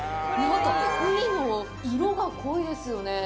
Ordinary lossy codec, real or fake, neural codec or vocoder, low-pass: none; real; none; none